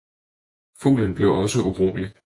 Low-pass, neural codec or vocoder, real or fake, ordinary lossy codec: 10.8 kHz; vocoder, 48 kHz, 128 mel bands, Vocos; fake; AAC, 64 kbps